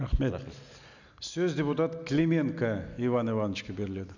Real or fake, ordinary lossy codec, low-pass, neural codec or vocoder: real; none; 7.2 kHz; none